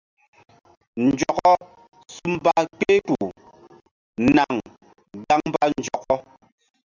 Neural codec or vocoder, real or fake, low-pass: none; real; 7.2 kHz